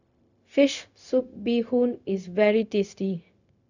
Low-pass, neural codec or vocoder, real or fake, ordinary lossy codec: 7.2 kHz; codec, 16 kHz, 0.4 kbps, LongCat-Audio-Codec; fake; none